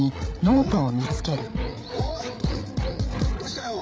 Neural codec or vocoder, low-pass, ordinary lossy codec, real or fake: codec, 16 kHz, 8 kbps, FreqCodec, larger model; none; none; fake